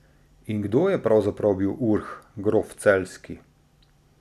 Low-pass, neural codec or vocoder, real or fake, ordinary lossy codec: 14.4 kHz; none; real; none